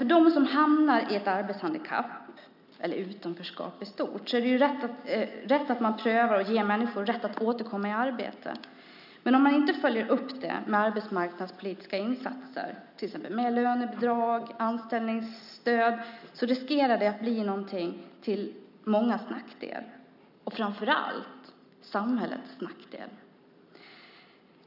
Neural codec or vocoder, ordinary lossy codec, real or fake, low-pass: none; none; real; 5.4 kHz